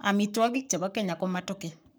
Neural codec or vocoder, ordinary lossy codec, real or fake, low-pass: codec, 44.1 kHz, 7.8 kbps, Pupu-Codec; none; fake; none